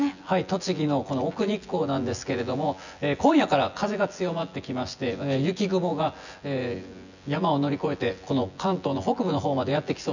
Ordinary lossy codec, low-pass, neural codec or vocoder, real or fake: none; 7.2 kHz; vocoder, 24 kHz, 100 mel bands, Vocos; fake